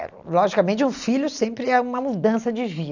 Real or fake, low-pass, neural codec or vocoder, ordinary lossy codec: real; 7.2 kHz; none; none